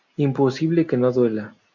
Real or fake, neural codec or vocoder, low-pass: real; none; 7.2 kHz